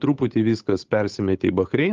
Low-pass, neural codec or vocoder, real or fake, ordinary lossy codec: 7.2 kHz; codec, 16 kHz, 8 kbps, FunCodec, trained on Chinese and English, 25 frames a second; fake; Opus, 32 kbps